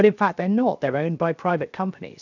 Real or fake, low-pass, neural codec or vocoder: fake; 7.2 kHz; codec, 16 kHz, 0.7 kbps, FocalCodec